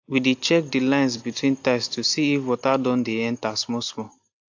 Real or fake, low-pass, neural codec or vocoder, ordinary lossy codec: real; 7.2 kHz; none; AAC, 48 kbps